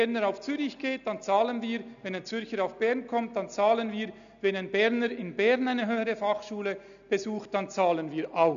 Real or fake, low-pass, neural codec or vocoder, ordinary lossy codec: real; 7.2 kHz; none; none